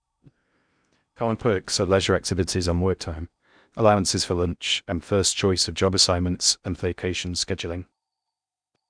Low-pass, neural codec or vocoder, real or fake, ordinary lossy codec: 9.9 kHz; codec, 16 kHz in and 24 kHz out, 0.6 kbps, FocalCodec, streaming, 4096 codes; fake; none